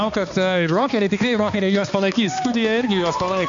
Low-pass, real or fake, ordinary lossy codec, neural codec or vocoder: 7.2 kHz; fake; MP3, 64 kbps; codec, 16 kHz, 2 kbps, X-Codec, HuBERT features, trained on balanced general audio